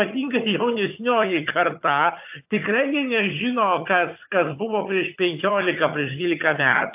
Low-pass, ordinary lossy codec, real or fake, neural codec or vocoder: 3.6 kHz; AAC, 32 kbps; fake; vocoder, 22.05 kHz, 80 mel bands, HiFi-GAN